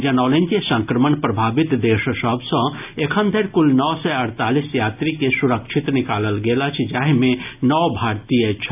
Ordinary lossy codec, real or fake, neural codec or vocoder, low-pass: AAC, 32 kbps; real; none; 3.6 kHz